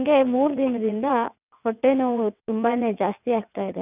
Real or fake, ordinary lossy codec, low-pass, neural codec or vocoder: fake; none; 3.6 kHz; vocoder, 22.05 kHz, 80 mel bands, WaveNeXt